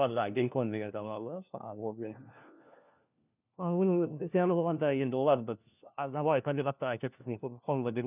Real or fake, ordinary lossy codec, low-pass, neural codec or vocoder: fake; none; 3.6 kHz; codec, 16 kHz, 1 kbps, FunCodec, trained on LibriTTS, 50 frames a second